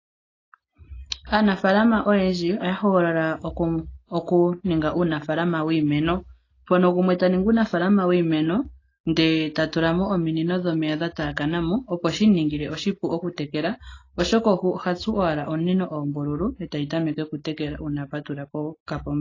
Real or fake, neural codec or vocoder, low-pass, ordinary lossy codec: real; none; 7.2 kHz; AAC, 32 kbps